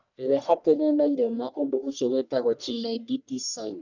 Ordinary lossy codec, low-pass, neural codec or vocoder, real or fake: none; 7.2 kHz; codec, 44.1 kHz, 1.7 kbps, Pupu-Codec; fake